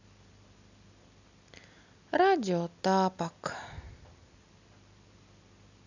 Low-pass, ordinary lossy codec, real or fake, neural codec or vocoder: 7.2 kHz; none; real; none